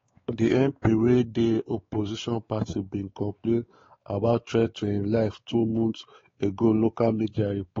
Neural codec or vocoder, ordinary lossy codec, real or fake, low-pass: codec, 16 kHz, 4 kbps, X-Codec, WavLM features, trained on Multilingual LibriSpeech; AAC, 24 kbps; fake; 7.2 kHz